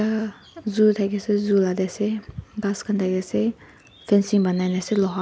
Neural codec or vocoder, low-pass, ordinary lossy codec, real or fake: none; none; none; real